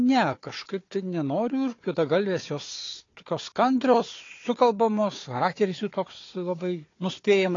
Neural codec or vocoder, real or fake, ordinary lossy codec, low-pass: codec, 16 kHz, 4 kbps, FunCodec, trained on Chinese and English, 50 frames a second; fake; AAC, 32 kbps; 7.2 kHz